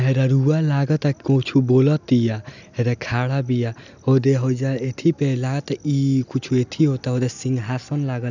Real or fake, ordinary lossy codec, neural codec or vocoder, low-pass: real; none; none; 7.2 kHz